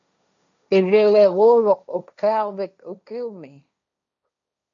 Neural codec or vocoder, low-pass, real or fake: codec, 16 kHz, 1.1 kbps, Voila-Tokenizer; 7.2 kHz; fake